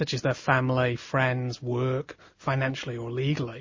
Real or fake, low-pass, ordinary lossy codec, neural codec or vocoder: real; 7.2 kHz; MP3, 32 kbps; none